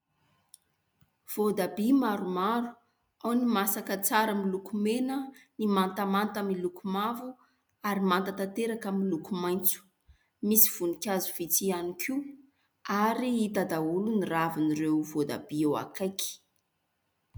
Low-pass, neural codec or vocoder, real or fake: 19.8 kHz; none; real